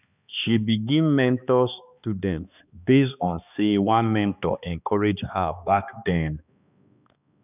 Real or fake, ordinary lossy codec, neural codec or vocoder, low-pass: fake; none; codec, 16 kHz, 2 kbps, X-Codec, HuBERT features, trained on balanced general audio; 3.6 kHz